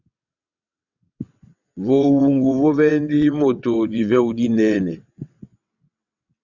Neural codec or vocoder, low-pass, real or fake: vocoder, 22.05 kHz, 80 mel bands, WaveNeXt; 7.2 kHz; fake